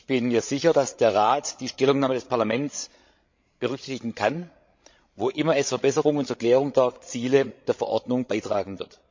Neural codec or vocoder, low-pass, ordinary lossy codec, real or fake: codec, 16 kHz, 16 kbps, FreqCodec, larger model; 7.2 kHz; MP3, 48 kbps; fake